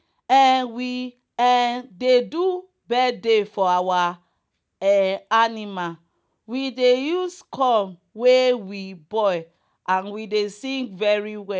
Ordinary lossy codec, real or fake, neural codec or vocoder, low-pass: none; real; none; none